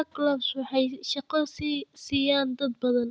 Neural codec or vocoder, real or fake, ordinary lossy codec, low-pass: none; real; none; none